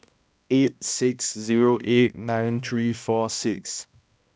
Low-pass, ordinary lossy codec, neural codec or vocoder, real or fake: none; none; codec, 16 kHz, 1 kbps, X-Codec, HuBERT features, trained on balanced general audio; fake